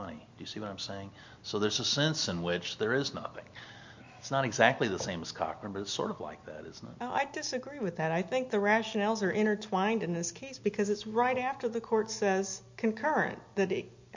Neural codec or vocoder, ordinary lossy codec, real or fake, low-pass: none; MP3, 64 kbps; real; 7.2 kHz